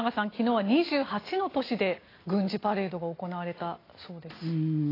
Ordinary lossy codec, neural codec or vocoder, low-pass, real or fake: AAC, 24 kbps; none; 5.4 kHz; real